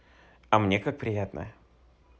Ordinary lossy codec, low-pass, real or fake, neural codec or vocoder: none; none; real; none